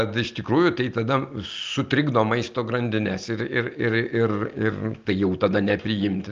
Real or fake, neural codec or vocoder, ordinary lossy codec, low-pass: real; none; Opus, 24 kbps; 7.2 kHz